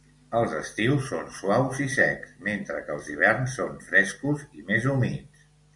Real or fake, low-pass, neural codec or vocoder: real; 10.8 kHz; none